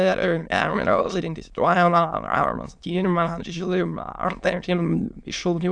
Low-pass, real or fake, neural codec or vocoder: 9.9 kHz; fake; autoencoder, 22.05 kHz, a latent of 192 numbers a frame, VITS, trained on many speakers